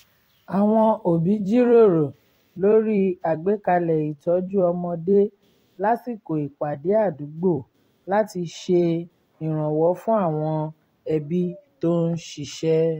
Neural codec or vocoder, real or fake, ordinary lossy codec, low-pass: vocoder, 44.1 kHz, 128 mel bands every 256 samples, BigVGAN v2; fake; AAC, 48 kbps; 19.8 kHz